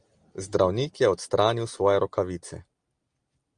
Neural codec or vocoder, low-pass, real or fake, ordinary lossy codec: none; 9.9 kHz; real; Opus, 32 kbps